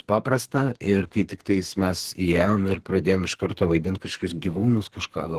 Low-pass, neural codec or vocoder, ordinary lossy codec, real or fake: 14.4 kHz; codec, 32 kHz, 1.9 kbps, SNAC; Opus, 16 kbps; fake